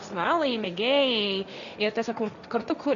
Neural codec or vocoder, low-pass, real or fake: codec, 16 kHz, 1.1 kbps, Voila-Tokenizer; 7.2 kHz; fake